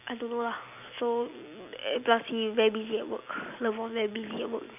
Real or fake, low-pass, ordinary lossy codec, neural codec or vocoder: real; 3.6 kHz; none; none